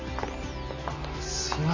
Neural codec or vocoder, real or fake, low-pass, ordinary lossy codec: none; real; 7.2 kHz; AAC, 48 kbps